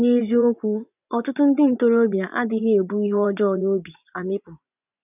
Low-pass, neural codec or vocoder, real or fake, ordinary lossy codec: 3.6 kHz; vocoder, 24 kHz, 100 mel bands, Vocos; fake; none